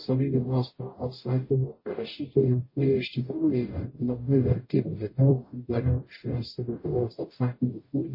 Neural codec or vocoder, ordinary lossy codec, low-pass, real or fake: codec, 44.1 kHz, 0.9 kbps, DAC; MP3, 24 kbps; 5.4 kHz; fake